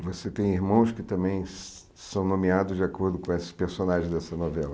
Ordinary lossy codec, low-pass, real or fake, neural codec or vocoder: none; none; real; none